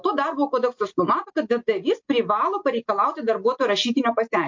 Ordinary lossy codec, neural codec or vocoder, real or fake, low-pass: MP3, 48 kbps; none; real; 7.2 kHz